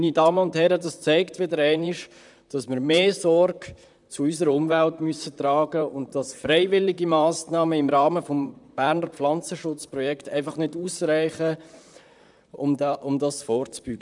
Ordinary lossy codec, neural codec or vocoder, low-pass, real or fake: none; vocoder, 44.1 kHz, 128 mel bands, Pupu-Vocoder; 10.8 kHz; fake